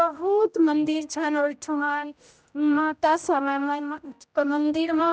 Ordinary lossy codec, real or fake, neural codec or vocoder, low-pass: none; fake; codec, 16 kHz, 0.5 kbps, X-Codec, HuBERT features, trained on general audio; none